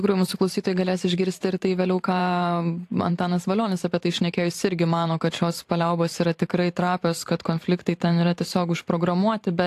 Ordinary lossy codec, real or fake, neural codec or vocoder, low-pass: AAC, 64 kbps; fake; vocoder, 44.1 kHz, 128 mel bands every 512 samples, BigVGAN v2; 14.4 kHz